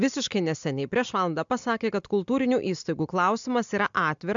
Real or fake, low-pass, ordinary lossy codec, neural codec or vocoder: real; 7.2 kHz; MP3, 64 kbps; none